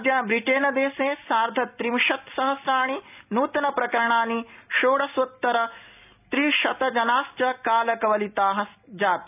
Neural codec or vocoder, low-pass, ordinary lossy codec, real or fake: none; 3.6 kHz; none; real